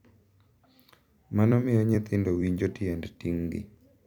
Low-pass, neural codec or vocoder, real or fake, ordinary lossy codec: 19.8 kHz; vocoder, 44.1 kHz, 128 mel bands every 256 samples, BigVGAN v2; fake; none